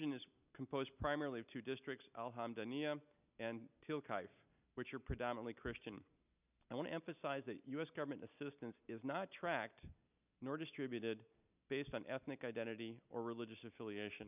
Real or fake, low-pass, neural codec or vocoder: real; 3.6 kHz; none